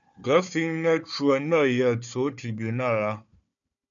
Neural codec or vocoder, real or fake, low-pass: codec, 16 kHz, 4 kbps, FunCodec, trained on Chinese and English, 50 frames a second; fake; 7.2 kHz